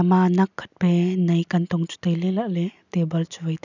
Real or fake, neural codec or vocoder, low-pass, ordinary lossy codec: real; none; 7.2 kHz; none